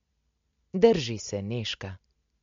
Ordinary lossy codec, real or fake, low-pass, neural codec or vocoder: MP3, 48 kbps; real; 7.2 kHz; none